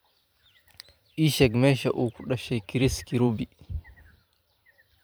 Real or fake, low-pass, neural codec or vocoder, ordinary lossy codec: fake; none; vocoder, 44.1 kHz, 128 mel bands every 512 samples, BigVGAN v2; none